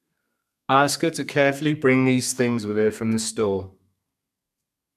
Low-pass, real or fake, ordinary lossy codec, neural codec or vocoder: 14.4 kHz; fake; none; codec, 32 kHz, 1.9 kbps, SNAC